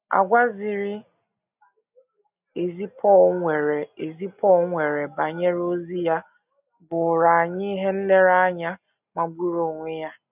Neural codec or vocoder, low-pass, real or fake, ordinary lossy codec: none; 3.6 kHz; real; none